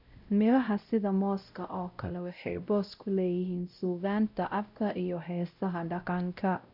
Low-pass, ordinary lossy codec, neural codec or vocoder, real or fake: 5.4 kHz; none; codec, 16 kHz, 0.5 kbps, X-Codec, WavLM features, trained on Multilingual LibriSpeech; fake